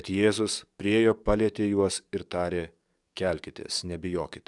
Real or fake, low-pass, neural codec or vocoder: fake; 10.8 kHz; codec, 44.1 kHz, 7.8 kbps, DAC